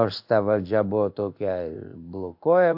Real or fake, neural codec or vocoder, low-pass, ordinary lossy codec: fake; codec, 16 kHz in and 24 kHz out, 1 kbps, XY-Tokenizer; 5.4 kHz; AAC, 48 kbps